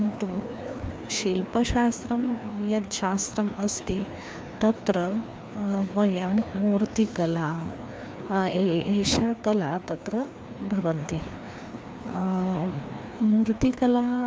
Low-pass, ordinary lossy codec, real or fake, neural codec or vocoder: none; none; fake; codec, 16 kHz, 2 kbps, FreqCodec, larger model